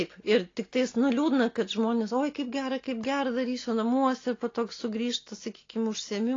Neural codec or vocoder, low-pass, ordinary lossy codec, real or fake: none; 7.2 kHz; AAC, 32 kbps; real